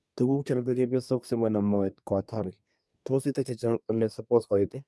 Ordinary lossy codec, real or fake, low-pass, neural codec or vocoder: none; fake; none; codec, 24 kHz, 1 kbps, SNAC